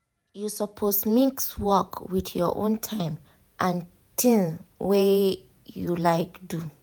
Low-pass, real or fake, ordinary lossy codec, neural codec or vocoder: none; fake; none; vocoder, 48 kHz, 128 mel bands, Vocos